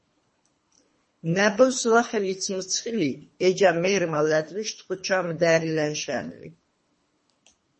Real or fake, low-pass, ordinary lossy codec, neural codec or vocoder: fake; 10.8 kHz; MP3, 32 kbps; codec, 24 kHz, 3 kbps, HILCodec